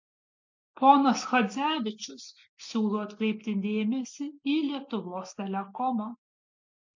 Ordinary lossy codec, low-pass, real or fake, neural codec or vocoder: MP3, 48 kbps; 7.2 kHz; real; none